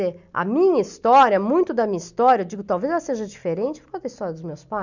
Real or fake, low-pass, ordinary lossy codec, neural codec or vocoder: real; 7.2 kHz; none; none